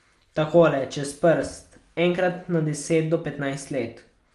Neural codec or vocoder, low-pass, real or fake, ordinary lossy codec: none; 10.8 kHz; real; Opus, 32 kbps